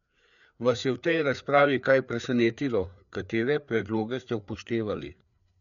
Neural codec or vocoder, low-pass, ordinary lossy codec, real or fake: codec, 16 kHz, 4 kbps, FreqCodec, larger model; 7.2 kHz; none; fake